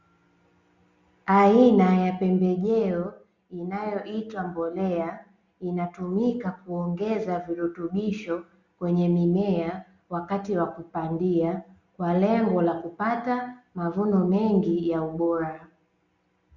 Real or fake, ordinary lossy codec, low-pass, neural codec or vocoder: real; Opus, 64 kbps; 7.2 kHz; none